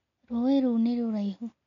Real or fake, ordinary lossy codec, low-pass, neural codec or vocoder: real; none; 7.2 kHz; none